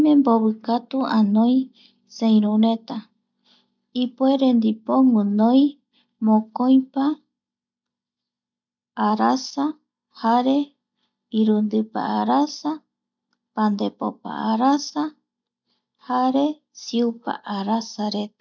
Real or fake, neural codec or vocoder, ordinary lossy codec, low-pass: real; none; none; 7.2 kHz